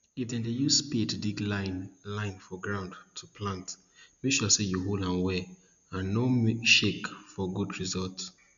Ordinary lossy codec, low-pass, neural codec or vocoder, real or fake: none; 7.2 kHz; none; real